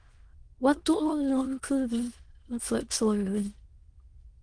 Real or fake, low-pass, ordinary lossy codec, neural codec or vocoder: fake; 9.9 kHz; Opus, 24 kbps; autoencoder, 22.05 kHz, a latent of 192 numbers a frame, VITS, trained on many speakers